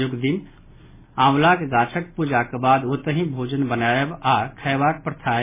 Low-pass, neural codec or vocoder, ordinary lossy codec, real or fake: 3.6 kHz; none; MP3, 16 kbps; real